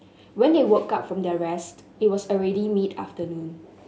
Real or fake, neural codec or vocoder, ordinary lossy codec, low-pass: real; none; none; none